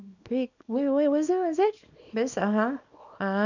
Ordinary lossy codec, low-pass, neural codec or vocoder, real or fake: none; 7.2 kHz; codec, 24 kHz, 0.9 kbps, WavTokenizer, small release; fake